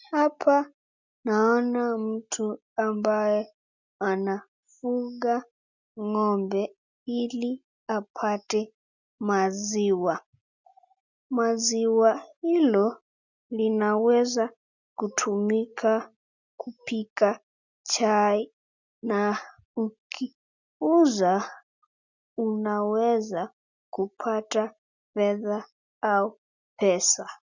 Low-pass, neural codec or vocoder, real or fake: 7.2 kHz; none; real